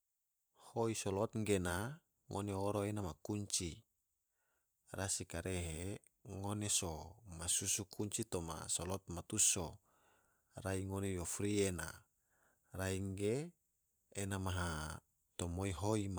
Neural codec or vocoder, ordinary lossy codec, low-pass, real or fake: vocoder, 44.1 kHz, 128 mel bands every 512 samples, BigVGAN v2; none; none; fake